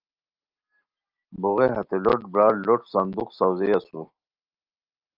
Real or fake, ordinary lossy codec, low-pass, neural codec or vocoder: real; Opus, 24 kbps; 5.4 kHz; none